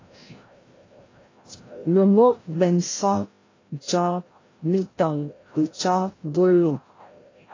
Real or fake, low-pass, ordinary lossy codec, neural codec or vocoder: fake; 7.2 kHz; AAC, 32 kbps; codec, 16 kHz, 0.5 kbps, FreqCodec, larger model